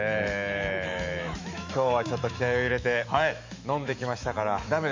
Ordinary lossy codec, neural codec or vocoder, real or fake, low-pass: MP3, 48 kbps; autoencoder, 48 kHz, 128 numbers a frame, DAC-VAE, trained on Japanese speech; fake; 7.2 kHz